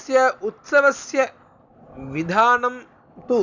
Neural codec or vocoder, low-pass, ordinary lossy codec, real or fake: none; 7.2 kHz; none; real